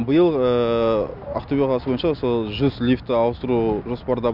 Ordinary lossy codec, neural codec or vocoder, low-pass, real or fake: none; none; 5.4 kHz; real